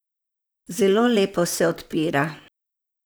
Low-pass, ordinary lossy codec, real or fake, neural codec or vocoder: none; none; fake; vocoder, 44.1 kHz, 128 mel bands, Pupu-Vocoder